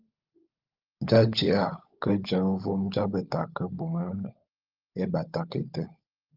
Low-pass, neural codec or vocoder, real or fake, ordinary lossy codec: 5.4 kHz; codec, 16 kHz, 16 kbps, FunCodec, trained on LibriTTS, 50 frames a second; fake; Opus, 24 kbps